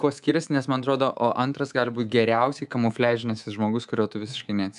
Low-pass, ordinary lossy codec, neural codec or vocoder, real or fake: 10.8 kHz; MP3, 96 kbps; codec, 24 kHz, 3.1 kbps, DualCodec; fake